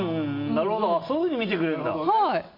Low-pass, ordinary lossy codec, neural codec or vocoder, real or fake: 5.4 kHz; MP3, 32 kbps; none; real